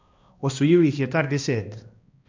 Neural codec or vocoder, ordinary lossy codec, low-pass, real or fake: codec, 16 kHz, 1 kbps, X-Codec, HuBERT features, trained on balanced general audio; MP3, 48 kbps; 7.2 kHz; fake